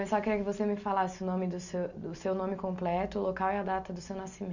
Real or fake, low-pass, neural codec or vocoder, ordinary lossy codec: real; 7.2 kHz; none; none